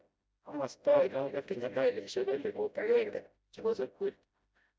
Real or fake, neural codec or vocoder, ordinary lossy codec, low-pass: fake; codec, 16 kHz, 0.5 kbps, FreqCodec, smaller model; none; none